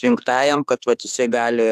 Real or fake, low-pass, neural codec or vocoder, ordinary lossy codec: fake; 14.4 kHz; autoencoder, 48 kHz, 32 numbers a frame, DAC-VAE, trained on Japanese speech; AAC, 96 kbps